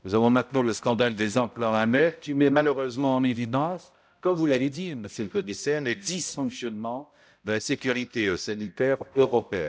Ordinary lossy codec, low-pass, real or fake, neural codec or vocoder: none; none; fake; codec, 16 kHz, 0.5 kbps, X-Codec, HuBERT features, trained on balanced general audio